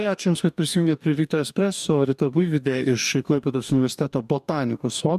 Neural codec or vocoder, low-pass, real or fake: codec, 44.1 kHz, 2.6 kbps, DAC; 14.4 kHz; fake